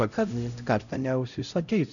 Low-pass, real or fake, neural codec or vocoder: 7.2 kHz; fake; codec, 16 kHz, 0.5 kbps, X-Codec, HuBERT features, trained on LibriSpeech